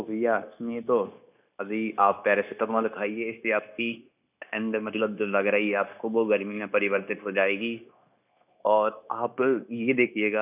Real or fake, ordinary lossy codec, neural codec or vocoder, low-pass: fake; none; codec, 16 kHz, 0.9 kbps, LongCat-Audio-Codec; 3.6 kHz